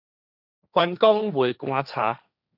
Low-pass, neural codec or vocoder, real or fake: 5.4 kHz; codec, 16 kHz, 1.1 kbps, Voila-Tokenizer; fake